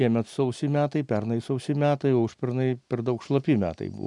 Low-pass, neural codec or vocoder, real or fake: 10.8 kHz; none; real